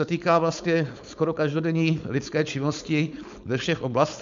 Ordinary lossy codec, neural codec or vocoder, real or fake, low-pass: MP3, 64 kbps; codec, 16 kHz, 4.8 kbps, FACodec; fake; 7.2 kHz